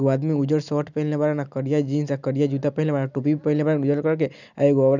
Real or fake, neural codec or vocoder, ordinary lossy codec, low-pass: real; none; none; 7.2 kHz